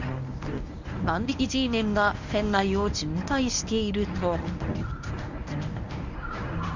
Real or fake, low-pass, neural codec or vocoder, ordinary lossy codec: fake; 7.2 kHz; codec, 24 kHz, 0.9 kbps, WavTokenizer, medium speech release version 1; none